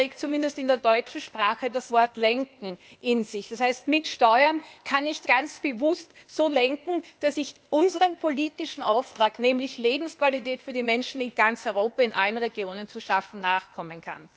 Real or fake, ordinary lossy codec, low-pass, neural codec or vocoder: fake; none; none; codec, 16 kHz, 0.8 kbps, ZipCodec